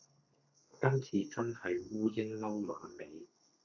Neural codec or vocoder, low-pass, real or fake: codec, 32 kHz, 1.9 kbps, SNAC; 7.2 kHz; fake